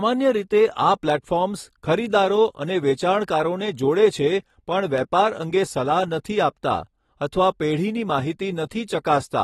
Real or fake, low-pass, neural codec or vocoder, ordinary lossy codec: real; 19.8 kHz; none; AAC, 32 kbps